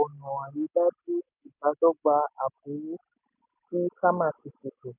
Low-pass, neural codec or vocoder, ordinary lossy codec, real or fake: 3.6 kHz; none; none; real